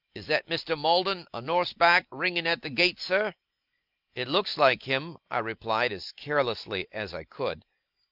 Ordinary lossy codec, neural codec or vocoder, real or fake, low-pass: Opus, 32 kbps; none; real; 5.4 kHz